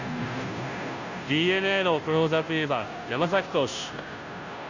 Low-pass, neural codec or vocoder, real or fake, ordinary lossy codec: 7.2 kHz; codec, 16 kHz, 0.5 kbps, FunCodec, trained on Chinese and English, 25 frames a second; fake; Opus, 64 kbps